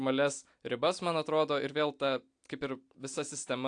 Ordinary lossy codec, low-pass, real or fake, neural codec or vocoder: AAC, 64 kbps; 9.9 kHz; real; none